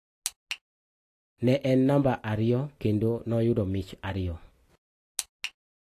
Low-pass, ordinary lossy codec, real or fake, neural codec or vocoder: 14.4 kHz; AAC, 48 kbps; real; none